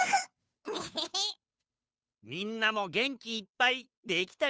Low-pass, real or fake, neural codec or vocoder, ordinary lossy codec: none; fake; codec, 16 kHz, 2 kbps, FunCodec, trained on Chinese and English, 25 frames a second; none